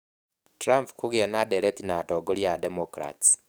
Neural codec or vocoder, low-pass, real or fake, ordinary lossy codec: codec, 44.1 kHz, 7.8 kbps, DAC; none; fake; none